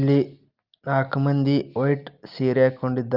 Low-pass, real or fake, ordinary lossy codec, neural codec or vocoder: 5.4 kHz; real; Opus, 24 kbps; none